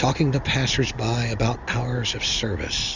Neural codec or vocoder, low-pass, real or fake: none; 7.2 kHz; real